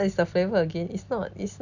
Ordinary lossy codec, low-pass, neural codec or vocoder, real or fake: none; 7.2 kHz; none; real